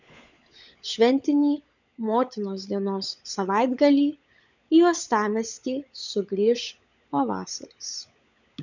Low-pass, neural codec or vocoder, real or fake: 7.2 kHz; codec, 16 kHz, 16 kbps, FunCodec, trained on LibriTTS, 50 frames a second; fake